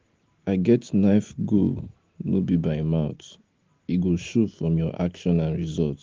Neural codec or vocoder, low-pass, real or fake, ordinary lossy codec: none; 7.2 kHz; real; Opus, 16 kbps